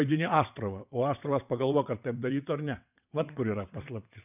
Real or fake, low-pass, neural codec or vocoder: fake; 3.6 kHz; vocoder, 22.05 kHz, 80 mel bands, WaveNeXt